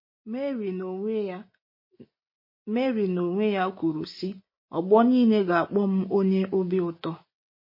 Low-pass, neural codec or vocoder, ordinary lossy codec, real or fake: 5.4 kHz; none; MP3, 24 kbps; real